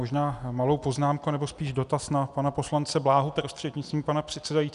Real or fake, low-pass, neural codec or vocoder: real; 10.8 kHz; none